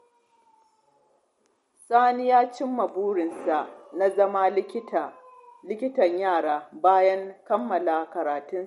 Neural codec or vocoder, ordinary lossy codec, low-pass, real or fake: none; MP3, 48 kbps; 19.8 kHz; real